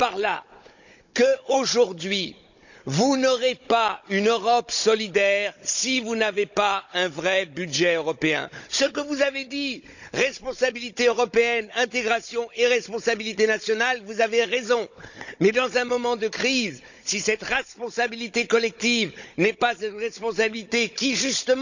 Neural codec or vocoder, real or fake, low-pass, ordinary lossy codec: codec, 16 kHz, 16 kbps, FunCodec, trained on Chinese and English, 50 frames a second; fake; 7.2 kHz; none